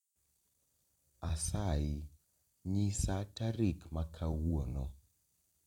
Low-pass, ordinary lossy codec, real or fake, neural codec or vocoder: 19.8 kHz; none; fake; vocoder, 44.1 kHz, 128 mel bands every 256 samples, BigVGAN v2